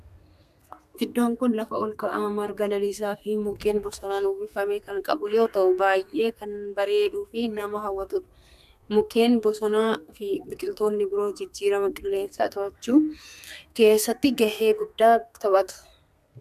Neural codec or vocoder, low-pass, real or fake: codec, 32 kHz, 1.9 kbps, SNAC; 14.4 kHz; fake